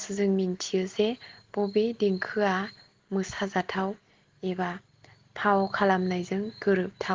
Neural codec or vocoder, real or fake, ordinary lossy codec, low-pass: none; real; Opus, 16 kbps; 7.2 kHz